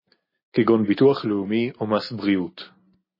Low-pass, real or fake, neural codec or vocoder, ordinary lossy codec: 5.4 kHz; real; none; MP3, 24 kbps